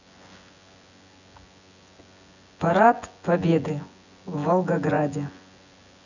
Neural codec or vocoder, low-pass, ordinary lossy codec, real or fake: vocoder, 24 kHz, 100 mel bands, Vocos; 7.2 kHz; none; fake